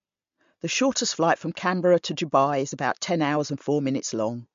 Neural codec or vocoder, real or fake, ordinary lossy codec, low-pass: none; real; MP3, 48 kbps; 7.2 kHz